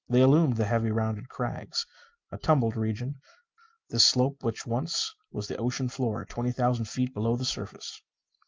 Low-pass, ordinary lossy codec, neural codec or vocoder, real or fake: 7.2 kHz; Opus, 24 kbps; none; real